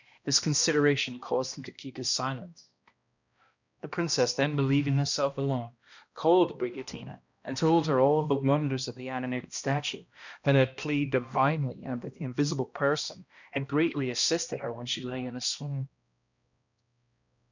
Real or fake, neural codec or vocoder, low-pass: fake; codec, 16 kHz, 1 kbps, X-Codec, HuBERT features, trained on balanced general audio; 7.2 kHz